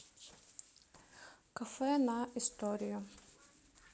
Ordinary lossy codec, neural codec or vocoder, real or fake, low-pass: none; none; real; none